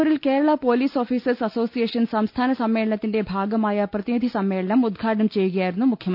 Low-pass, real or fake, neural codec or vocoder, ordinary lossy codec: 5.4 kHz; real; none; none